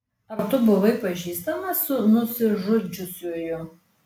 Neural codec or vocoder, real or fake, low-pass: none; real; 19.8 kHz